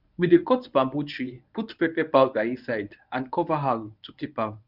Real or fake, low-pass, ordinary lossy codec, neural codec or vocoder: fake; 5.4 kHz; none; codec, 24 kHz, 0.9 kbps, WavTokenizer, medium speech release version 1